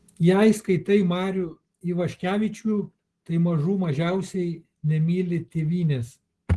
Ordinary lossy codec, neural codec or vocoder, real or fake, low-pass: Opus, 16 kbps; none; real; 10.8 kHz